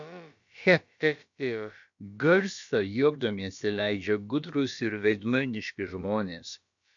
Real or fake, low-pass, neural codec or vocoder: fake; 7.2 kHz; codec, 16 kHz, about 1 kbps, DyCAST, with the encoder's durations